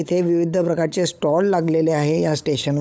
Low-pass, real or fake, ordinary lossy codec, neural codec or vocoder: none; fake; none; codec, 16 kHz, 16 kbps, FunCodec, trained on LibriTTS, 50 frames a second